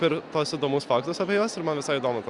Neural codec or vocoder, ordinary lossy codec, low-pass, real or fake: none; Opus, 64 kbps; 10.8 kHz; real